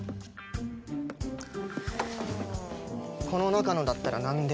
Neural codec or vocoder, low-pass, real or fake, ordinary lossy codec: none; none; real; none